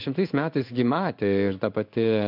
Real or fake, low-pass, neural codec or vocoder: fake; 5.4 kHz; codec, 16 kHz in and 24 kHz out, 1 kbps, XY-Tokenizer